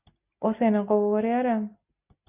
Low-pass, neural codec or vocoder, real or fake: 3.6 kHz; none; real